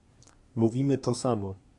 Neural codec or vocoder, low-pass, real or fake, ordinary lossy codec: codec, 24 kHz, 1 kbps, SNAC; 10.8 kHz; fake; MP3, 64 kbps